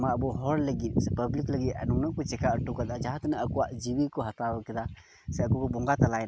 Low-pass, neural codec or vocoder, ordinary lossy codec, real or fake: none; none; none; real